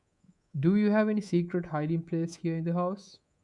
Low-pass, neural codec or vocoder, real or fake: 10.8 kHz; codec, 24 kHz, 3.1 kbps, DualCodec; fake